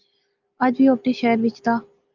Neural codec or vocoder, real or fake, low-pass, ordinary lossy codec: none; real; 7.2 kHz; Opus, 24 kbps